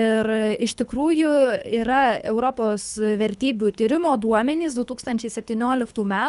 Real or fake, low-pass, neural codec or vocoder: fake; 10.8 kHz; codec, 24 kHz, 3 kbps, HILCodec